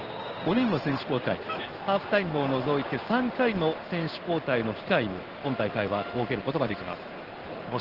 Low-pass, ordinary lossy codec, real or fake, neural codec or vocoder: 5.4 kHz; Opus, 16 kbps; fake; codec, 16 kHz in and 24 kHz out, 1 kbps, XY-Tokenizer